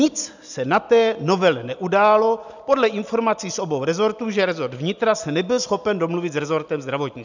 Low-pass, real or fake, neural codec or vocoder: 7.2 kHz; real; none